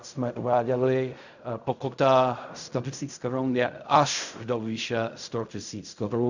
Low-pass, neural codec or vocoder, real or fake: 7.2 kHz; codec, 16 kHz in and 24 kHz out, 0.4 kbps, LongCat-Audio-Codec, fine tuned four codebook decoder; fake